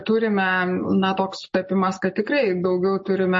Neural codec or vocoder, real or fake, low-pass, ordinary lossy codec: none; real; 10.8 kHz; MP3, 32 kbps